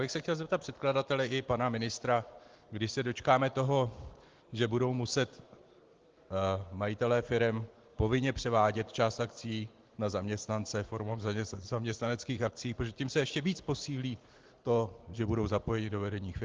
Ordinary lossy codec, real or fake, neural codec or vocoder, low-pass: Opus, 16 kbps; real; none; 7.2 kHz